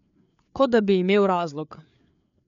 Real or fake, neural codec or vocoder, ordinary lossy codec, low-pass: fake; codec, 16 kHz, 4 kbps, FreqCodec, larger model; none; 7.2 kHz